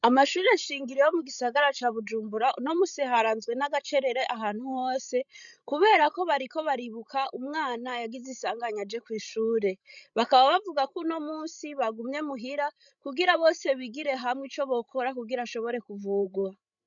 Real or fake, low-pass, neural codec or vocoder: fake; 7.2 kHz; codec, 16 kHz, 16 kbps, FreqCodec, larger model